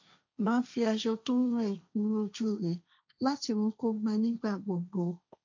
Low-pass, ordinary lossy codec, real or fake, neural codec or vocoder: 7.2 kHz; MP3, 48 kbps; fake; codec, 16 kHz, 1.1 kbps, Voila-Tokenizer